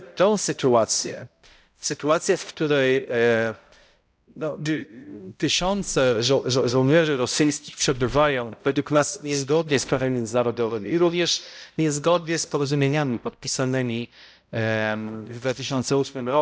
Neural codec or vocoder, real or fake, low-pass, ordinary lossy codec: codec, 16 kHz, 0.5 kbps, X-Codec, HuBERT features, trained on balanced general audio; fake; none; none